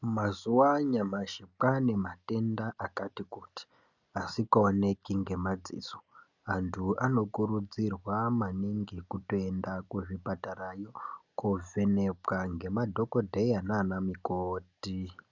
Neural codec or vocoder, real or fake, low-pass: none; real; 7.2 kHz